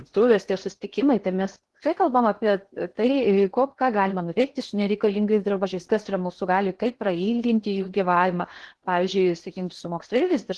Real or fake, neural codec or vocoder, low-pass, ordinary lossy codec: fake; codec, 16 kHz in and 24 kHz out, 0.8 kbps, FocalCodec, streaming, 65536 codes; 10.8 kHz; Opus, 16 kbps